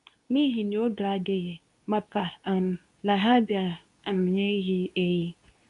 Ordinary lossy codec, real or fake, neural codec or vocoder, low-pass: none; fake; codec, 24 kHz, 0.9 kbps, WavTokenizer, medium speech release version 2; 10.8 kHz